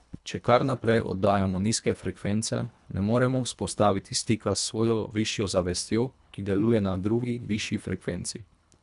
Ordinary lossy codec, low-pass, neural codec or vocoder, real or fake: none; 10.8 kHz; codec, 24 kHz, 1.5 kbps, HILCodec; fake